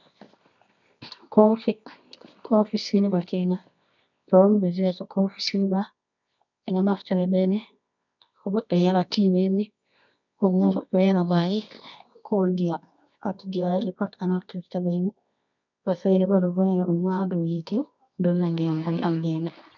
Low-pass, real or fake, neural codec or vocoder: 7.2 kHz; fake; codec, 24 kHz, 0.9 kbps, WavTokenizer, medium music audio release